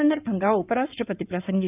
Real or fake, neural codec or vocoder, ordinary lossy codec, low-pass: fake; codec, 16 kHz in and 24 kHz out, 2.2 kbps, FireRedTTS-2 codec; none; 3.6 kHz